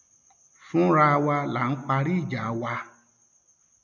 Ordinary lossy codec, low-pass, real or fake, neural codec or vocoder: none; 7.2 kHz; real; none